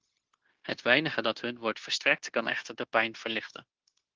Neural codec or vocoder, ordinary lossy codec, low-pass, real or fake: codec, 16 kHz, 0.9 kbps, LongCat-Audio-Codec; Opus, 16 kbps; 7.2 kHz; fake